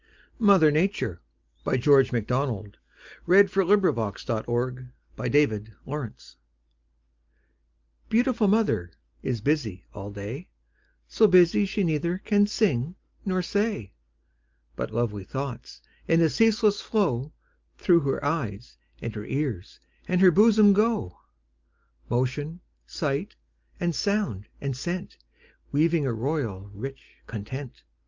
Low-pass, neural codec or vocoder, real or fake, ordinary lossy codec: 7.2 kHz; none; real; Opus, 24 kbps